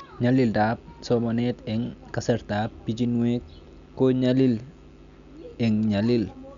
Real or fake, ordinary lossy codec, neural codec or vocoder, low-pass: real; none; none; 7.2 kHz